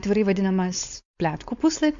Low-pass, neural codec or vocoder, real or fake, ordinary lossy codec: 7.2 kHz; codec, 16 kHz, 4.8 kbps, FACodec; fake; MP3, 64 kbps